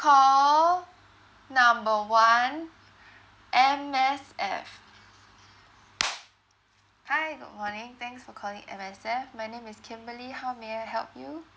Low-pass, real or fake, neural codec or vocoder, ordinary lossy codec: none; real; none; none